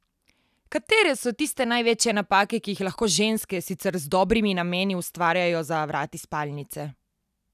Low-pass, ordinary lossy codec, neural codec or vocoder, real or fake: 14.4 kHz; none; none; real